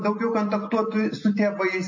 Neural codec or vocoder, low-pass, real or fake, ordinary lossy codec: none; 7.2 kHz; real; MP3, 32 kbps